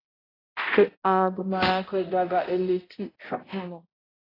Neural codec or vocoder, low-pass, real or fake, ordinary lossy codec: codec, 16 kHz, 0.5 kbps, X-Codec, HuBERT features, trained on balanced general audio; 5.4 kHz; fake; AAC, 24 kbps